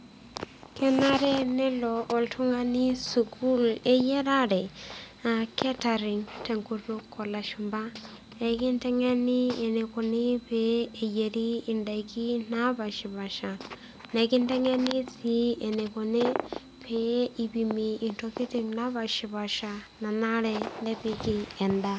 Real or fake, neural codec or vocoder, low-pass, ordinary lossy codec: real; none; none; none